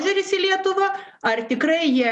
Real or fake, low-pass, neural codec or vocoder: real; 10.8 kHz; none